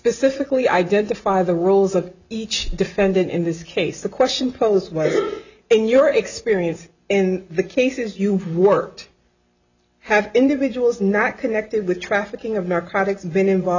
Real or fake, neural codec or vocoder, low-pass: real; none; 7.2 kHz